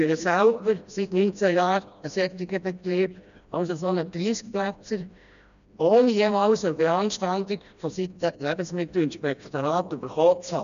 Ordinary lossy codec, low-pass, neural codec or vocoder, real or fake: none; 7.2 kHz; codec, 16 kHz, 1 kbps, FreqCodec, smaller model; fake